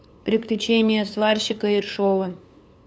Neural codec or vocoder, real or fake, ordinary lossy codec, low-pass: codec, 16 kHz, 2 kbps, FunCodec, trained on LibriTTS, 25 frames a second; fake; none; none